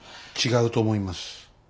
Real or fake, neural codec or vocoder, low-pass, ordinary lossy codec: real; none; none; none